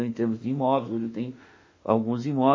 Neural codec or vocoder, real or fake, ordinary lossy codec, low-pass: autoencoder, 48 kHz, 32 numbers a frame, DAC-VAE, trained on Japanese speech; fake; MP3, 32 kbps; 7.2 kHz